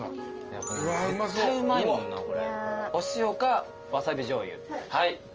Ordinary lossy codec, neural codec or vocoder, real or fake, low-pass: Opus, 24 kbps; none; real; 7.2 kHz